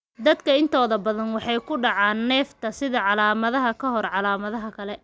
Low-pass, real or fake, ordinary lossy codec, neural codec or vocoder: none; real; none; none